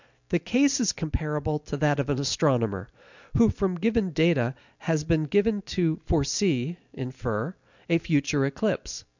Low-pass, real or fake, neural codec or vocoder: 7.2 kHz; real; none